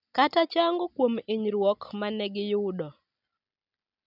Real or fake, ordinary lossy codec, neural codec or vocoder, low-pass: real; none; none; 5.4 kHz